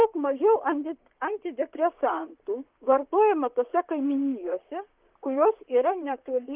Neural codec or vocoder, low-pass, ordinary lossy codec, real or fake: vocoder, 44.1 kHz, 128 mel bands, Pupu-Vocoder; 3.6 kHz; Opus, 32 kbps; fake